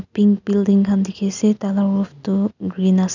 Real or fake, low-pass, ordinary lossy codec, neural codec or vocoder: real; 7.2 kHz; none; none